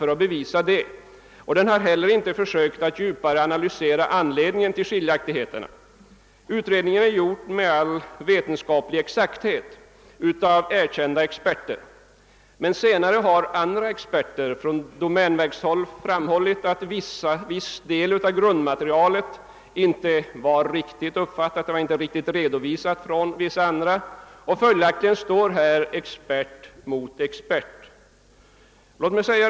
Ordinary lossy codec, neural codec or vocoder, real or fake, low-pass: none; none; real; none